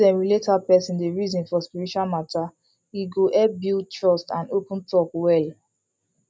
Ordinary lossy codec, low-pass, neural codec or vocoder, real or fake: none; none; none; real